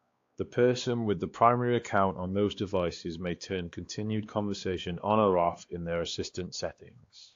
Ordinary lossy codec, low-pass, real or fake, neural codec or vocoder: AAC, 48 kbps; 7.2 kHz; fake; codec, 16 kHz, 2 kbps, X-Codec, WavLM features, trained on Multilingual LibriSpeech